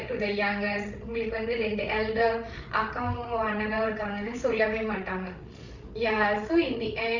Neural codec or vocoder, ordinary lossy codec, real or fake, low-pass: codec, 16 kHz, 8 kbps, FreqCodec, larger model; none; fake; 7.2 kHz